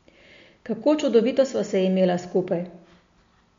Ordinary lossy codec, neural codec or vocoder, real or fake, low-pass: MP3, 48 kbps; none; real; 7.2 kHz